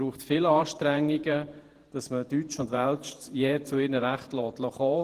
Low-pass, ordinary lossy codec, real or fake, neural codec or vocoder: 14.4 kHz; Opus, 16 kbps; fake; vocoder, 48 kHz, 128 mel bands, Vocos